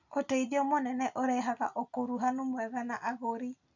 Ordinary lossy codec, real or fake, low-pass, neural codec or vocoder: none; real; 7.2 kHz; none